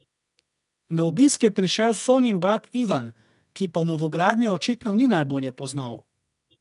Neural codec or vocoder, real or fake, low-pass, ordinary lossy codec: codec, 24 kHz, 0.9 kbps, WavTokenizer, medium music audio release; fake; 10.8 kHz; none